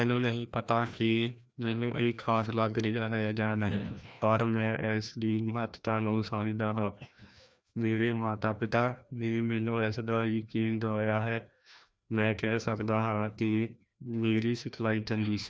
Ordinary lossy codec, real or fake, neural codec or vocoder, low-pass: none; fake; codec, 16 kHz, 1 kbps, FreqCodec, larger model; none